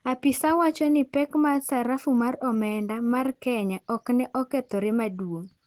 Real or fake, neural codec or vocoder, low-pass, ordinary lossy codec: real; none; 19.8 kHz; Opus, 16 kbps